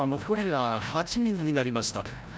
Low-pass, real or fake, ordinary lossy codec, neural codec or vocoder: none; fake; none; codec, 16 kHz, 0.5 kbps, FreqCodec, larger model